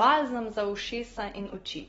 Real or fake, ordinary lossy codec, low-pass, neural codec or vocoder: real; AAC, 24 kbps; 14.4 kHz; none